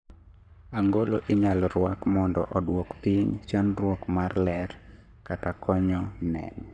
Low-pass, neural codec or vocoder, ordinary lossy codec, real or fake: 9.9 kHz; codec, 44.1 kHz, 7.8 kbps, Pupu-Codec; none; fake